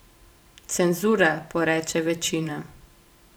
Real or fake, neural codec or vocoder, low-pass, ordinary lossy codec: fake; vocoder, 44.1 kHz, 128 mel bands every 512 samples, BigVGAN v2; none; none